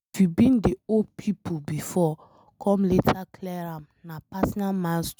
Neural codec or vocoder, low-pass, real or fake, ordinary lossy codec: none; none; real; none